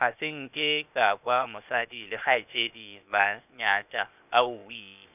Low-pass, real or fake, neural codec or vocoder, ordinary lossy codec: 3.6 kHz; fake; codec, 16 kHz, 0.8 kbps, ZipCodec; none